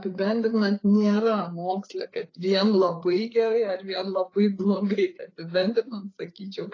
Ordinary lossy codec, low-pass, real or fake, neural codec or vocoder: AAC, 32 kbps; 7.2 kHz; fake; codec, 16 kHz, 4 kbps, FreqCodec, larger model